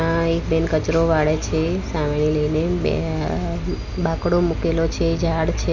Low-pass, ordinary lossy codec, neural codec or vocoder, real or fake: 7.2 kHz; none; none; real